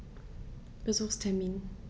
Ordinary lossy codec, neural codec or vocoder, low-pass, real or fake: none; none; none; real